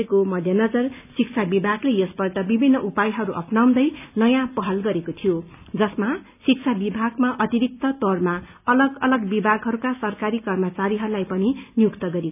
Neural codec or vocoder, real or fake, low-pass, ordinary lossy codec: none; real; 3.6 kHz; none